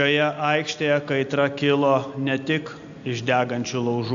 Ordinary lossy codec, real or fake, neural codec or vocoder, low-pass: AAC, 64 kbps; real; none; 7.2 kHz